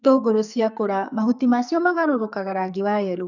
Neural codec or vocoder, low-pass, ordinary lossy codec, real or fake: codec, 44.1 kHz, 2.6 kbps, SNAC; 7.2 kHz; none; fake